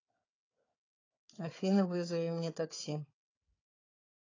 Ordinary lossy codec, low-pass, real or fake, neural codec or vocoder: AAC, 32 kbps; 7.2 kHz; fake; codec, 16 kHz, 4 kbps, FunCodec, trained on Chinese and English, 50 frames a second